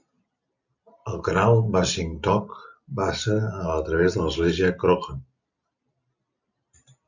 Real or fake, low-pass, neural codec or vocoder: real; 7.2 kHz; none